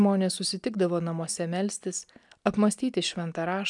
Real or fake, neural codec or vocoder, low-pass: real; none; 10.8 kHz